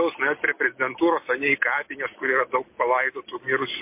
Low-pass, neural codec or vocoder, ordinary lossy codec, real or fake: 3.6 kHz; none; MP3, 24 kbps; real